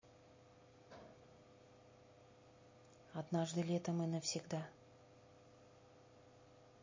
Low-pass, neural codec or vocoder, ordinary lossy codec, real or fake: 7.2 kHz; none; MP3, 32 kbps; real